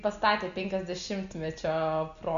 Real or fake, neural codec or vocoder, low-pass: real; none; 7.2 kHz